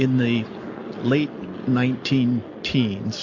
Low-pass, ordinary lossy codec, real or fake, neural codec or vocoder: 7.2 kHz; AAC, 48 kbps; real; none